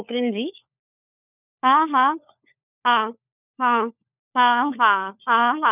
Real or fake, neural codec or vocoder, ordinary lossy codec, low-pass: fake; codec, 16 kHz, 4 kbps, FunCodec, trained on LibriTTS, 50 frames a second; none; 3.6 kHz